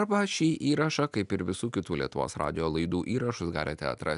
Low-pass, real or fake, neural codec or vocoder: 10.8 kHz; real; none